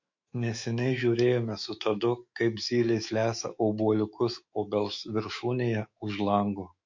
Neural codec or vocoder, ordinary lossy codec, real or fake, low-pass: autoencoder, 48 kHz, 128 numbers a frame, DAC-VAE, trained on Japanese speech; MP3, 48 kbps; fake; 7.2 kHz